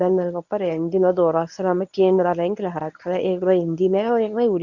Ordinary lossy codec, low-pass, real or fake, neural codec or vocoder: none; 7.2 kHz; fake; codec, 24 kHz, 0.9 kbps, WavTokenizer, medium speech release version 1